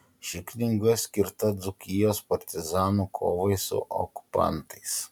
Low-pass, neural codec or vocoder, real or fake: 19.8 kHz; none; real